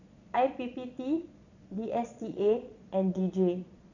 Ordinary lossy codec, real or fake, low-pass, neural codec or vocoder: none; fake; 7.2 kHz; vocoder, 22.05 kHz, 80 mel bands, Vocos